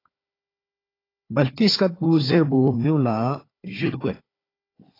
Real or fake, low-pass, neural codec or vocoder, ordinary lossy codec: fake; 5.4 kHz; codec, 16 kHz, 4 kbps, FunCodec, trained on Chinese and English, 50 frames a second; AAC, 24 kbps